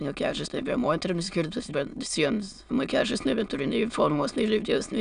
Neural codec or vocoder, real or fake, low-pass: autoencoder, 22.05 kHz, a latent of 192 numbers a frame, VITS, trained on many speakers; fake; 9.9 kHz